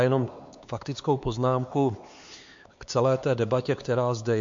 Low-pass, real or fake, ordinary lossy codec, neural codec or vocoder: 7.2 kHz; fake; MP3, 48 kbps; codec, 16 kHz, 4 kbps, X-Codec, HuBERT features, trained on LibriSpeech